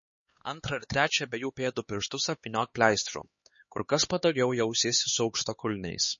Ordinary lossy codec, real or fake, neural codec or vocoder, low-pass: MP3, 32 kbps; fake; codec, 16 kHz, 4 kbps, X-Codec, HuBERT features, trained on LibriSpeech; 7.2 kHz